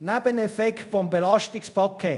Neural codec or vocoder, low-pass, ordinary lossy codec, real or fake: codec, 24 kHz, 0.5 kbps, DualCodec; 10.8 kHz; none; fake